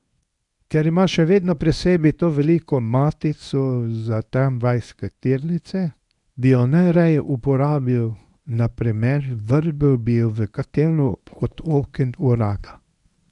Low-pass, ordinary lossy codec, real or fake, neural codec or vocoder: 10.8 kHz; none; fake; codec, 24 kHz, 0.9 kbps, WavTokenizer, medium speech release version 1